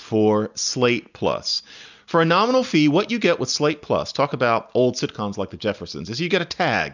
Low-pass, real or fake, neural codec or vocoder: 7.2 kHz; real; none